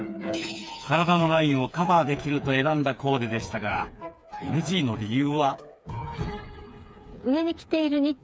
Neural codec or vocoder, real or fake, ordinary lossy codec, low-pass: codec, 16 kHz, 4 kbps, FreqCodec, smaller model; fake; none; none